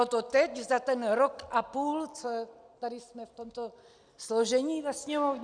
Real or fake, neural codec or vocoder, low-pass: real; none; 9.9 kHz